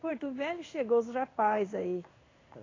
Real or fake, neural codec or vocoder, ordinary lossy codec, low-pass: fake; codec, 16 kHz in and 24 kHz out, 1 kbps, XY-Tokenizer; AAC, 32 kbps; 7.2 kHz